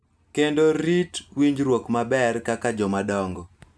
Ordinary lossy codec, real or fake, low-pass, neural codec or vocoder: none; real; none; none